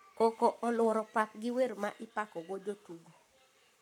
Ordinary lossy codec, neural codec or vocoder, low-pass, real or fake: none; codec, 44.1 kHz, 7.8 kbps, Pupu-Codec; 19.8 kHz; fake